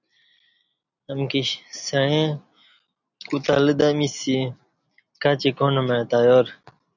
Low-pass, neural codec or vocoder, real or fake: 7.2 kHz; none; real